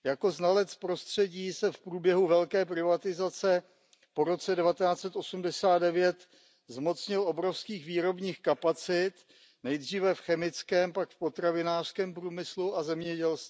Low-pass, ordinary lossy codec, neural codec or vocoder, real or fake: none; none; none; real